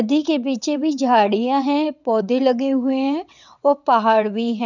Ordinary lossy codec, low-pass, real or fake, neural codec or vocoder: none; 7.2 kHz; fake; vocoder, 22.05 kHz, 80 mel bands, WaveNeXt